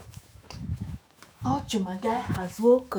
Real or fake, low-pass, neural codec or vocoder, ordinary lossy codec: fake; none; autoencoder, 48 kHz, 128 numbers a frame, DAC-VAE, trained on Japanese speech; none